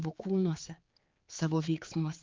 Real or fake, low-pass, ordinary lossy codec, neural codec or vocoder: fake; 7.2 kHz; Opus, 24 kbps; codec, 16 kHz, 4 kbps, X-Codec, HuBERT features, trained on general audio